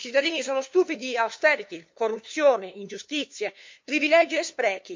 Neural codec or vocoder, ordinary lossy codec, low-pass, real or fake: codec, 16 kHz, 2 kbps, FunCodec, trained on LibriTTS, 25 frames a second; MP3, 48 kbps; 7.2 kHz; fake